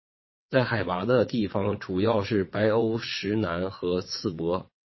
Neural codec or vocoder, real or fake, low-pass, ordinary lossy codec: vocoder, 22.05 kHz, 80 mel bands, WaveNeXt; fake; 7.2 kHz; MP3, 24 kbps